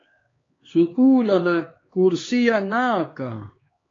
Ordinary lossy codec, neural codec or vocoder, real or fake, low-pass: AAC, 32 kbps; codec, 16 kHz, 2 kbps, X-Codec, HuBERT features, trained on LibriSpeech; fake; 7.2 kHz